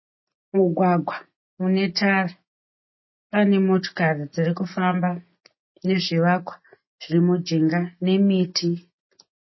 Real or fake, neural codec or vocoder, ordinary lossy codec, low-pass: real; none; MP3, 24 kbps; 7.2 kHz